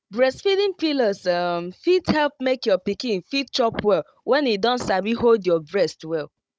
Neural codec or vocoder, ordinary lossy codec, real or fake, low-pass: codec, 16 kHz, 16 kbps, FunCodec, trained on Chinese and English, 50 frames a second; none; fake; none